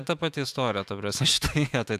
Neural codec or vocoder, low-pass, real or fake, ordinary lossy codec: autoencoder, 48 kHz, 128 numbers a frame, DAC-VAE, trained on Japanese speech; 14.4 kHz; fake; AAC, 96 kbps